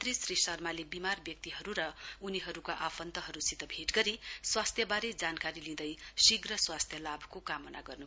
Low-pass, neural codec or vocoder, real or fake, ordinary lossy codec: none; none; real; none